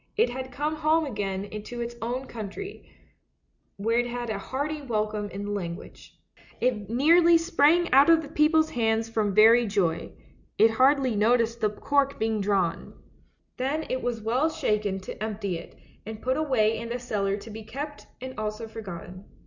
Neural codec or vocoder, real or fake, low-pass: none; real; 7.2 kHz